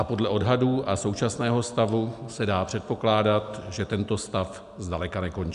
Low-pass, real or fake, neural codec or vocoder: 10.8 kHz; real; none